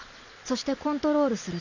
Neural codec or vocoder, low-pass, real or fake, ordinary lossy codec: none; 7.2 kHz; real; none